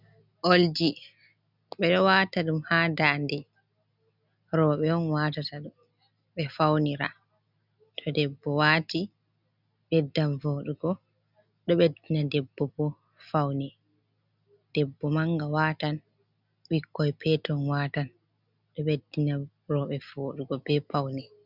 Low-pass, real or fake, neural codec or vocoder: 5.4 kHz; real; none